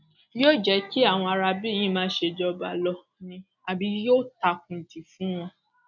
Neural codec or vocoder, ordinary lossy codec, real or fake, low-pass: none; none; real; 7.2 kHz